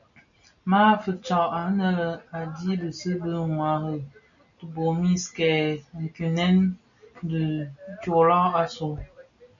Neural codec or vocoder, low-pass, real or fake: none; 7.2 kHz; real